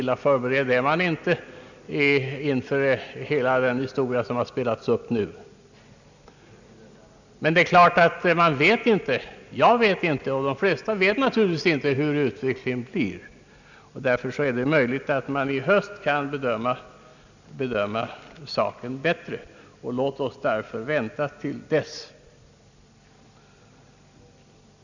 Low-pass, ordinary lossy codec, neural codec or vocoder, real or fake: 7.2 kHz; none; none; real